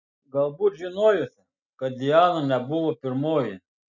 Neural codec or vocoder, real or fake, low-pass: none; real; 7.2 kHz